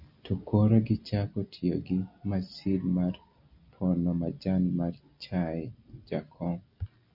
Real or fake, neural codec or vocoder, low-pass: real; none; 5.4 kHz